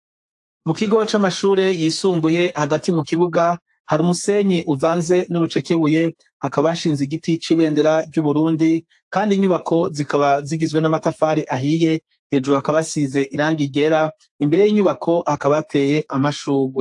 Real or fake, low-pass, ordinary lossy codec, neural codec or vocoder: fake; 10.8 kHz; AAC, 64 kbps; codec, 32 kHz, 1.9 kbps, SNAC